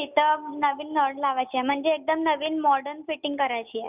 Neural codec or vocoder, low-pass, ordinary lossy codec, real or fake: none; 3.6 kHz; none; real